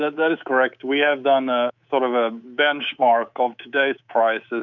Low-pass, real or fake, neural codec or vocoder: 7.2 kHz; fake; codec, 24 kHz, 3.1 kbps, DualCodec